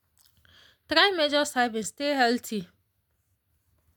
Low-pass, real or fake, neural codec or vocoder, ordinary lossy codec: 19.8 kHz; real; none; none